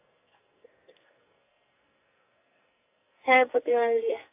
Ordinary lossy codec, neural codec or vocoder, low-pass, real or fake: AAC, 24 kbps; codec, 44.1 kHz, 2.6 kbps, SNAC; 3.6 kHz; fake